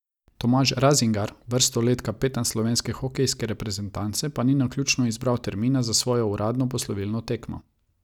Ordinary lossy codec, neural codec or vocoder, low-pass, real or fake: none; none; 19.8 kHz; real